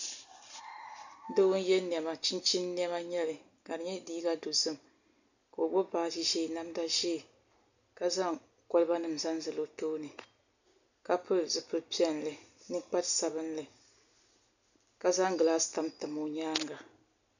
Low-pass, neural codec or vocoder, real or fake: 7.2 kHz; none; real